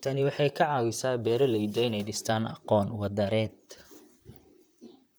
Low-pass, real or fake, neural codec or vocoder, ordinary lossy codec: none; fake; vocoder, 44.1 kHz, 128 mel bands, Pupu-Vocoder; none